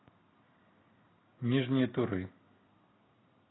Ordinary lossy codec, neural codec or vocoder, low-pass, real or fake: AAC, 16 kbps; none; 7.2 kHz; real